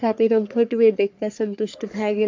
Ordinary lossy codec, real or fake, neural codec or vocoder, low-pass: MP3, 64 kbps; fake; codec, 44.1 kHz, 3.4 kbps, Pupu-Codec; 7.2 kHz